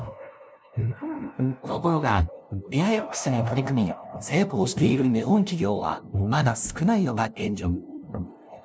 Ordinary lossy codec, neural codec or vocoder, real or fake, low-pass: none; codec, 16 kHz, 0.5 kbps, FunCodec, trained on LibriTTS, 25 frames a second; fake; none